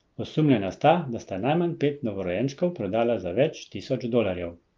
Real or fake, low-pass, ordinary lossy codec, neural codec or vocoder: real; 7.2 kHz; Opus, 32 kbps; none